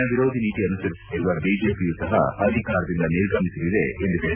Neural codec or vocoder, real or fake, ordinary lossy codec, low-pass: none; real; none; 3.6 kHz